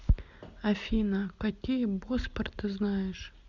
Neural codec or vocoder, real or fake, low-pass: none; real; 7.2 kHz